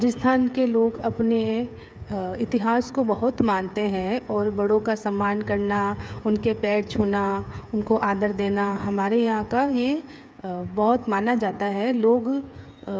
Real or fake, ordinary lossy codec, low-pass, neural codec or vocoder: fake; none; none; codec, 16 kHz, 8 kbps, FreqCodec, smaller model